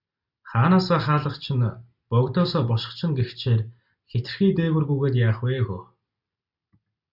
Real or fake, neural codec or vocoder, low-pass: real; none; 5.4 kHz